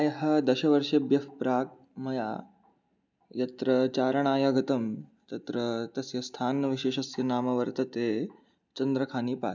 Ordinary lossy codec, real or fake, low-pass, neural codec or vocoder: none; real; 7.2 kHz; none